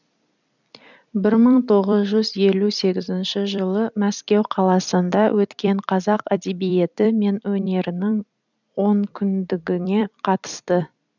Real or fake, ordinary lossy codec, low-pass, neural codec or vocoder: fake; none; 7.2 kHz; vocoder, 44.1 kHz, 128 mel bands every 256 samples, BigVGAN v2